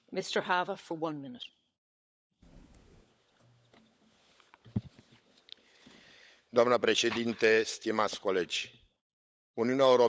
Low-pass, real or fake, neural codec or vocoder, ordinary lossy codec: none; fake; codec, 16 kHz, 16 kbps, FunCodec, trained on LibriTTS, 50 frames a second; none